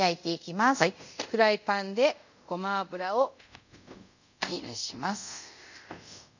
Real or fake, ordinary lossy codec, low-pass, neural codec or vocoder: fake; none; 7.2 kHz; codec, 24 kHz, 0.5 kbps, DualCodec